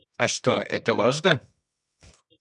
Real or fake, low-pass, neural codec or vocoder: fake; 10.8 kHz; codec, 24 kHz, 0.9 kbps, WavTokenizer, medium music audio release